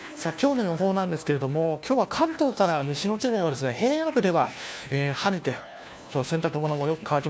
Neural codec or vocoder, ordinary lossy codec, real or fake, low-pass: codec, 16 kHz, 1 kbps, FunCodec, trained on LibriTTS, 50 frames a second; none; fake; none